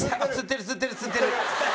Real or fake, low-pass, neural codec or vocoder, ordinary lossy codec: real; none; none; none